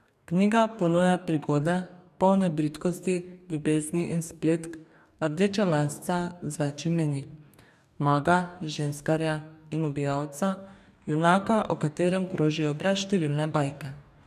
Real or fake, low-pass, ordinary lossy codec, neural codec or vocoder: fake; 14.4 kHz; none; codec, 44.1 kHz, 2.6 kbps, DAC